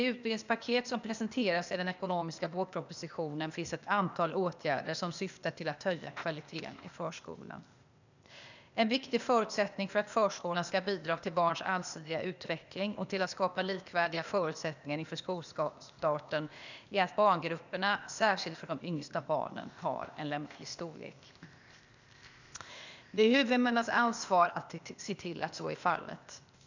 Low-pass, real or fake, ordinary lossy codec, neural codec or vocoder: 7.2 kHz; fake; none; codec, 16 kHz, 0.8 kbps, ZipCodec